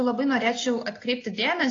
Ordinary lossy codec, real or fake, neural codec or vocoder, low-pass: AAC, 32 kbps; real; none; 7.2 kHz